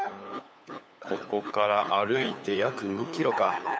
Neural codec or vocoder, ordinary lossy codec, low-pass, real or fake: codec, 16 kHz, 8 kbps, FunCodec, trained on LibriTTS, 25 frames a second; none; none; fake